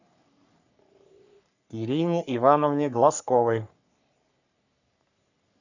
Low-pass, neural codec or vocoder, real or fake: 7.2 kHz; codec, 44.1 kHz, 3.4 kbps, Pupu-Codec; fake